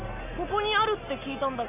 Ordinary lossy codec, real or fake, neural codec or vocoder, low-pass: none; real; none; 3.6 kHz